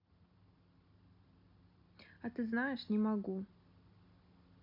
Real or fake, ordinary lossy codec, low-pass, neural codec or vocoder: real; none; 5.4 kHz; none